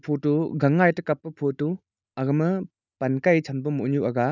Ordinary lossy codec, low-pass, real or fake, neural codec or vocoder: none; 7.2 kHz; real; none